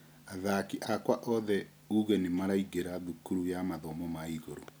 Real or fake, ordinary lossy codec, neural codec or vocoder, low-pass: real; none; none; none